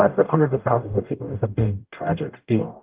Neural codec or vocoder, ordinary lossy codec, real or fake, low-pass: codec, 44.1 kHz, 0.9 kbps, DAC; Opus, 24 kbps; fake; 3.6 kHz